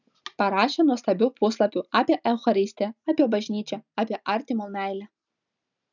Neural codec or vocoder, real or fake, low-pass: none; real; 7.2 kHz